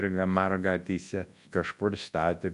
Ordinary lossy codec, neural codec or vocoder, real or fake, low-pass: AAC, 64 kbps; codec, 24 kHz, 0.9 kbps, WavTokenizer, large speech release; fake; 10.8 kHz